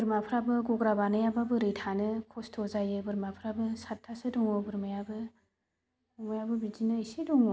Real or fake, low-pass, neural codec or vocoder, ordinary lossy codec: real; none; none; none